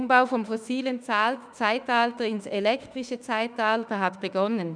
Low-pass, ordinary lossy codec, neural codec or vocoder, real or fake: 9.9 kHz; none; autoencoder, 48 kHz, 32 numbers a frame, DAC-VAE, trained on Japanese speech; fake